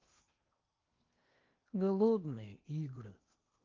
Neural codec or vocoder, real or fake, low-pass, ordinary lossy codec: codec, 16 kHz in and 24 kHz out, 0.8 kbps, FocalCodec, streaming, 65536 codes; fake; 7.2 kHz; Opus, 32 kbps